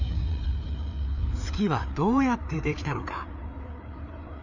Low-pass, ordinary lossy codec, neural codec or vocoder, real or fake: 7.2 kHz; none; codec, 16 kHz, 4 kbps, FreqCodec, larger model; fake